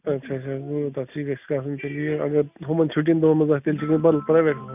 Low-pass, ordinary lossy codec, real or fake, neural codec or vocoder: 3.6 kHz; none; real; none